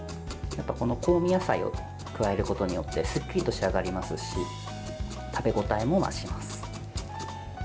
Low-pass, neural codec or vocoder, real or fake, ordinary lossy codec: none; none; real; none